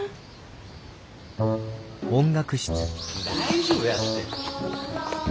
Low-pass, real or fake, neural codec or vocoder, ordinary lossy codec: none; real; none; none